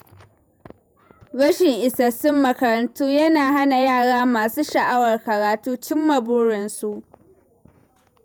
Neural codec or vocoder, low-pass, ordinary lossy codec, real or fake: vocoder, 48 kHz, 128 mel bands, Vocos; none; none; fake